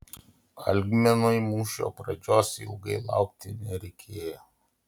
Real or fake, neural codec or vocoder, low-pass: real; none; 19.8 kHz